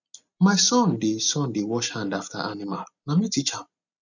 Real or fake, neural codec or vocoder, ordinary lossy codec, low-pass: real; none; none; 7.2 kHz